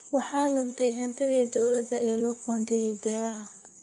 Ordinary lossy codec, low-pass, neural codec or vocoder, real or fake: none; 10.8 kHz; codec, 24 kHz, 1 kbps, SNAC; fake